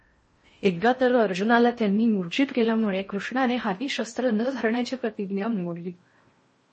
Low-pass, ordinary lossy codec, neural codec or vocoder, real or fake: 10.8 kHz; MP3, 32 kbps; codec, 16 kHz in and 24 kHz out, 0.6 kbps, FocalCodec, streaming, 4096 codes; fake